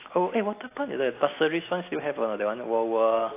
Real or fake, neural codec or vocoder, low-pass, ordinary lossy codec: real; none; 3.6 kHz; AAC, 24 kbps